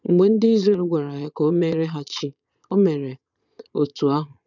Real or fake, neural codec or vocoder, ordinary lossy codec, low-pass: fake; vocoder, 22.05 kHz, 80 mel bands, Vocos; none; 7.2 kHz